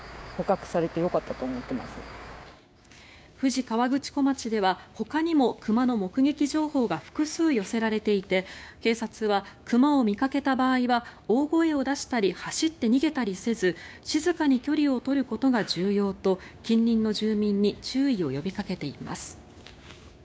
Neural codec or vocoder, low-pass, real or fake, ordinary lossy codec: codec, 16 kHz, 6 kbps, DAC; none; fake; none